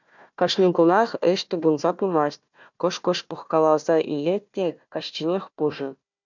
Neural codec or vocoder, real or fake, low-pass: codec, 16 kHz, 1 kbps, FunCodec, trained on Chinese and English, 50 frames a second; fake; 7.2 kHz